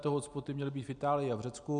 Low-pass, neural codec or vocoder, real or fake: 9.9 kHz; none; real